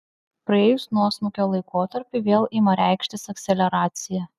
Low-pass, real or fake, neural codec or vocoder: 14.4 kHz; real; none